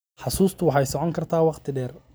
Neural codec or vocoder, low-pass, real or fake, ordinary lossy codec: none; none; real; none